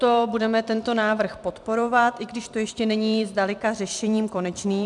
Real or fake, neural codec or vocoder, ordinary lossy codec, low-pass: fake; vocoder, 44.1 kHz, 128 mel bands every 256 samples, BigVGAN v2; MP3, 96 kbps; 10.8 kHz